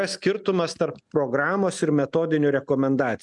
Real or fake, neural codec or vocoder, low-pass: real; none; 10.8 kHz